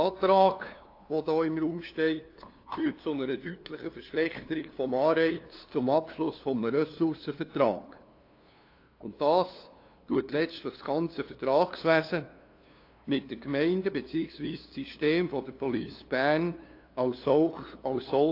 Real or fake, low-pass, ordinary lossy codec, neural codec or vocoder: fake; 5.4 kHz; AAC, 32 kbps; codec, 16 kHz, 2 kbps, FunCodec, trained on LibriTTS, 25 frames a second